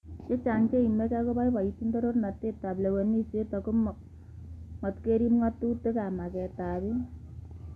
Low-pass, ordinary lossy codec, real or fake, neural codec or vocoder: none; none; real; none